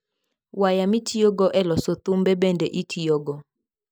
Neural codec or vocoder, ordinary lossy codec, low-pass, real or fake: none; none; none; real